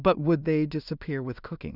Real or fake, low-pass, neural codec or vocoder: fake; 5.4 kHz; codec, 16 kHz in and 24 kHz out, 0.4 kbps, LongCat-Audio-Codec, two codebook decoder